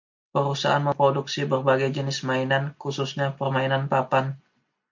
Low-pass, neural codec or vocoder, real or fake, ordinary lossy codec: 7.2 kHz; none; real; MP3, 64 kbps